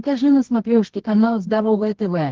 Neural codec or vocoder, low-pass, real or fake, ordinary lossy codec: codec, 24 kHz, 0.9 kbps, WavTokenizer, medium music audio release; 7.2 kHz; fake; Opus, 16 kbps